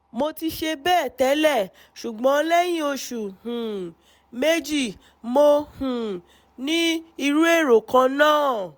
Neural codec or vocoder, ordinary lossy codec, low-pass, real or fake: none; none; none; real